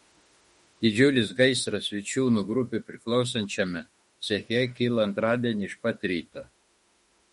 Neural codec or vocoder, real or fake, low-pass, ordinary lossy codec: autoencoder, 48 kHz, 32 numbers a frame, DAC-VAE, trained on Japanese speech; fake; 19.8 kHz; MP3, 48 kbps